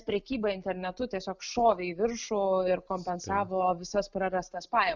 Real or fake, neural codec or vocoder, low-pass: real; none; 7.2 kHz